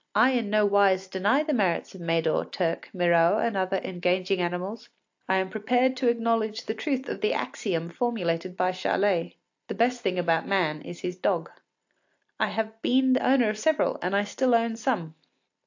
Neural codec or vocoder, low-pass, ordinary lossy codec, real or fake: none; 7.2 kHz; AAC, 48 kbps; real